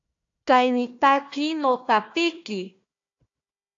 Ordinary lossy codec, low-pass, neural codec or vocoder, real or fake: AAC, 48 kbps; 7.2 kHz; codec, 16 kHz, 1 kbps, FunCodec, trained on Chinese and English, 50 frames a second; fake